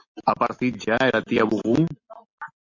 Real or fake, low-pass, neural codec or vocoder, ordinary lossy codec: real; 7.2 kHz; none; MP3, 48 kbps